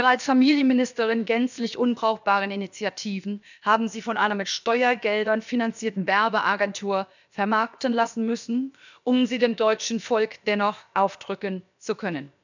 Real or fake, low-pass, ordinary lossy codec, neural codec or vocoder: fake; 7.2 kHz; none; codec, 16 kHz, about 1 kbps, DyCAST, with the encoder's durations